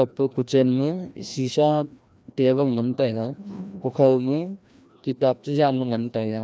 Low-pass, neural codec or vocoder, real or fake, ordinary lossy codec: none; codec, 16 kHz, 1 kbps, FreqCodec, larger model; fake; none